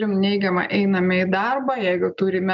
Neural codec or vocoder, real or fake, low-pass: none; real; 7.2 kHz